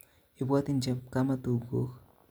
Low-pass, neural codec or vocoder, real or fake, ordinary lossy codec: none; none; real; none